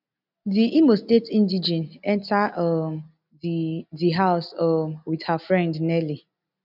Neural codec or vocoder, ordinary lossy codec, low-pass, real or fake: none; none; 5.4 kHz; real